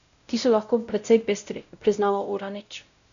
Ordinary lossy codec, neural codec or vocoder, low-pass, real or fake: none; codec, 16 kHz, 0.5 kbps, X-Codec, WavLM features, trained on Multilingual LibriSpeech; 7.2 kHz; fake